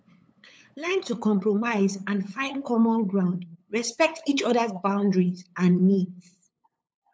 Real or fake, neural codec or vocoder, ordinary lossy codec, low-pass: fake; codec, 16 kHz, 8 kbps, FunCodec, trained on LibriTTS, 25 frames a second; none; none